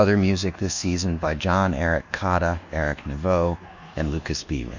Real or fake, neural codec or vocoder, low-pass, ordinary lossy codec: fake; codec, 24 kHz, 1.2 kbps, DualCodec; 7.2 kHz; Opus, 64 kbps